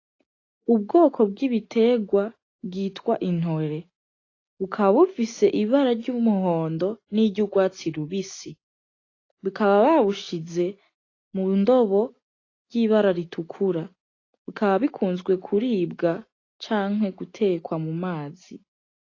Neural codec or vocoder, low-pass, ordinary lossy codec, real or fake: none; 7.2 kHz; AAC, 32 kbps; real